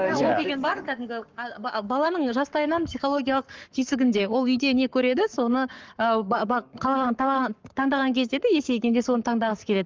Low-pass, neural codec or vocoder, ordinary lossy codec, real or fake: 7.2 kHz; codec, 44.1 kHz, 7.8 kbps, Pupu-Codec; Opus, 24 kbps; fake